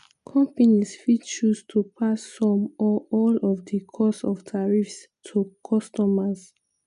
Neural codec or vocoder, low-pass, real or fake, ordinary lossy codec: none; 10.8 kHz; real; none